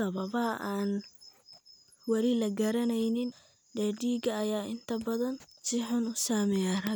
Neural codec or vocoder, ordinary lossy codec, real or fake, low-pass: none; none; real; none